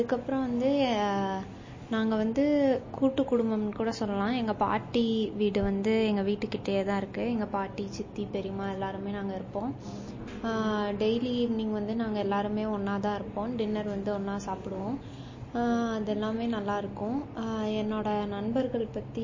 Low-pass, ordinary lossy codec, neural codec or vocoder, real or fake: 7.2 kHz; MP3, 32 kbps; none; real